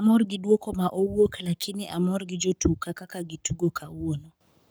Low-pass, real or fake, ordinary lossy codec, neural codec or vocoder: none; fake; none; codec, 44.1 kHz, 7.8 kbps, Pupu-Codec